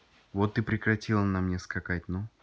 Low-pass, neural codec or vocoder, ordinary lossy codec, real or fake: none; none; none; real